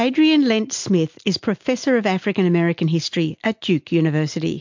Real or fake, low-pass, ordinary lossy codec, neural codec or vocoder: real; 7.2 kHz; MP3, 48 kbps; none